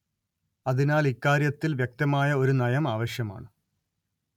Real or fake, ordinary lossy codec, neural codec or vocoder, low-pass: real; MP3, 96 kbps; none; 19.8 kHz